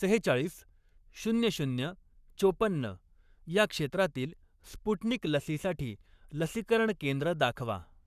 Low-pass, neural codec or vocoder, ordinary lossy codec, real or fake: 14.4 kHz; codec, 44.1 kHz, 7.8 kbps, Pupu-Codec; none; fake